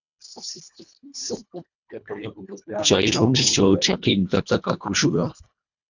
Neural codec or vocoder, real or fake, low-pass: codec, 24 kHz, 1.5 kbps, HILCodec; fake; 7.2 kHz